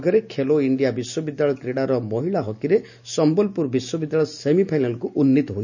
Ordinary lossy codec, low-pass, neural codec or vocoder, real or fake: none; 7.2 kHz; none; real